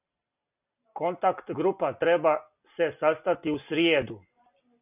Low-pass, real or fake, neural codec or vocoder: 3.6 kHz; real; none